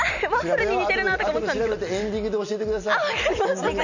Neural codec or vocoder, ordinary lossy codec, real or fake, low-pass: none; none; real; 7.2 kHz